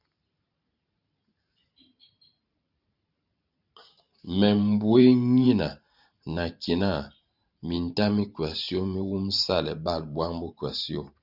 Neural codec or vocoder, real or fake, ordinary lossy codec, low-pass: vocoder, 44.1 kHz, 128 mel bands every 512 samples, BigVGAN v2; fake; Opus, 64 kbps; 5.4 kHz